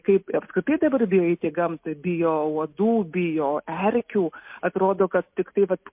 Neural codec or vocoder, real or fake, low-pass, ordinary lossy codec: none; real; 3.6 kHz; MP3, 32 kbps